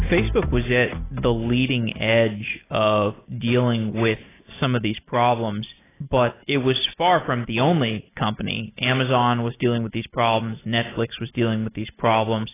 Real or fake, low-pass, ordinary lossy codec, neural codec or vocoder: real; 3.6 kHz; AAC, 16 kbps; none